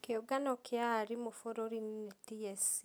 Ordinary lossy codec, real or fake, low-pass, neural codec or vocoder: none; real; none; none